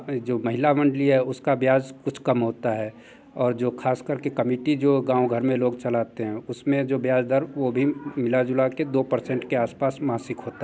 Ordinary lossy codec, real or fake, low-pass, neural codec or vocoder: none; real; none; none